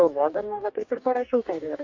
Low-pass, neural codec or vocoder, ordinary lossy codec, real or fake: 7.2 kHz; codec, 44.1 kHz, 2.6 kbps, DAC; MP3, 64 kbps; fake